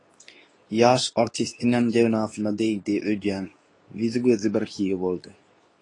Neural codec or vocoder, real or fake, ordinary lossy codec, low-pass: codec, 24 kHz, 0.9 kbps, WavTokenizer, medium speech release version 2; fake; AAC, 32 kbps; 10.8 kHz